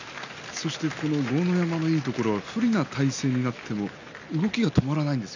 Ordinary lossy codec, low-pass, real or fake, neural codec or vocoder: none; 7.2 kHz; real; none